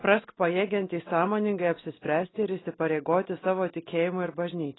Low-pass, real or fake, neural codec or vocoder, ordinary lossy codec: 7.2 kHz; real; none; AAC, 16 kbps